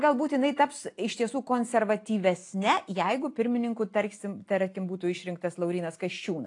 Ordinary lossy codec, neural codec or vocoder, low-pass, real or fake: AAC, 48 kbps; none; 10.8 kHz; real